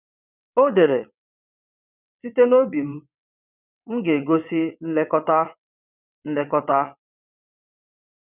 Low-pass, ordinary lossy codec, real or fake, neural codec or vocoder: 3.6 kHz; none; fake; vocoder, 22.05 kHz, 80 mel bands, WaveNeXt